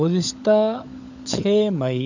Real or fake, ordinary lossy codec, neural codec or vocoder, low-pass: fake; none; codec, 16 kHz, 16 kbps, FunCodec, trained on LibriTTS, 50 frames a second; 7.2 kHz